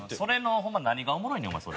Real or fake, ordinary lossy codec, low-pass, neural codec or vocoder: real; none; none; none